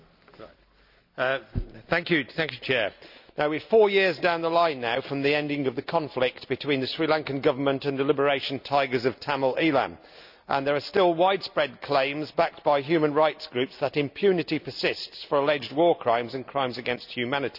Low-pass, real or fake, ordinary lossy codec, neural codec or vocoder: 5.4 kHz; real; none; none